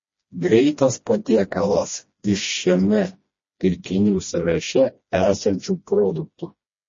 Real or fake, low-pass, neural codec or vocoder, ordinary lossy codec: fake; 7.2 kHz; codec, 16 kHz, 1 kbps, FreqCodec, smaller model; MP3, 32 kbps